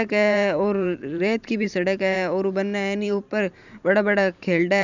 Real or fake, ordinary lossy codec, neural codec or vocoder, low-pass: fake; none; vocoder, 44.1 kHz, 128 mel bands every 512 samples, BigVGAN v2; 7.2 kHz